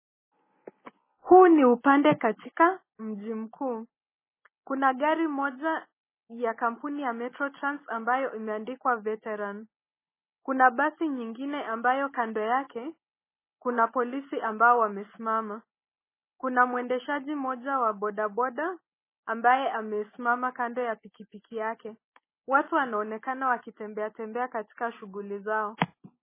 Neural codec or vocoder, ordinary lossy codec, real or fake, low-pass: none; MP3, 16 kbps; real; 3.6 kHz